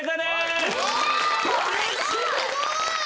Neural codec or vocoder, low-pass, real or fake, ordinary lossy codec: none; none; real; none